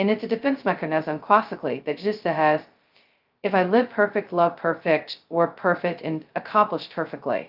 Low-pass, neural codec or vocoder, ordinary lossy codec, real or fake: 5.4 kHz; codec, 16 kHz, 0.2 kbps, FocalCodec; Opus, 24 kbps; fake